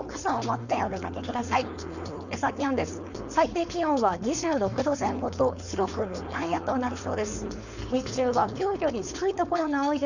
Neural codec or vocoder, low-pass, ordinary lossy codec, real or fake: codec, 16 kHz, 4.8 kbps, FACodec; 7.2 kHz; none; fake